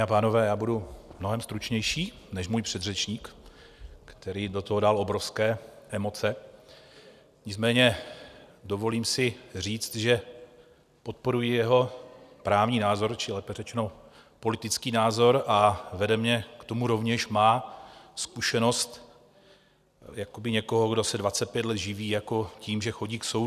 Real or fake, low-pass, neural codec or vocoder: real; 14.4 kHz; none